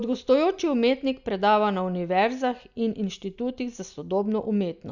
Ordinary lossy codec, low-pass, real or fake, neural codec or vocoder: none; 7.2 kHz; real; none